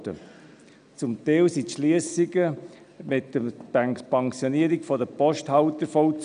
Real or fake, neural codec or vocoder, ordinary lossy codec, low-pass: real; none; none; 9.9 kHz